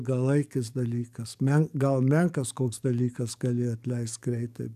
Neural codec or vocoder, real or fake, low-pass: codec, 44.1 kHz, 7.8 kbps, DAC; fake; 14.4 kHz